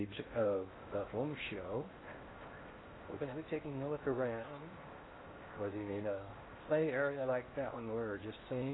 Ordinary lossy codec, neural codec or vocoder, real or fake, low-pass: AAC, 16 kbps; codec, 16 kHz in and 24 kHz out, 0.6 kbps, FocalCodec, streaming, 4096 codes; fake; 7.2 kHz